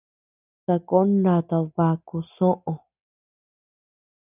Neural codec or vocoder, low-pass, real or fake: none; 3.6 kHz; real